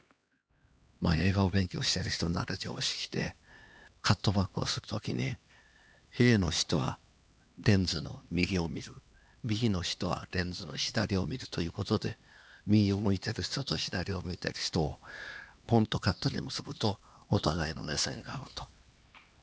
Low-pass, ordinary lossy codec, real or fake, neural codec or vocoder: none; none; fake; codec, 16 kHz, 2 kbps, X-Codec, HuBERT features, trained on LibriSpeech